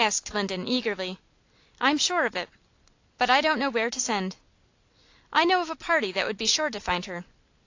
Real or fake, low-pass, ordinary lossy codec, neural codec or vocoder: real; 7.2 kHz; AAC, 48 kbps; none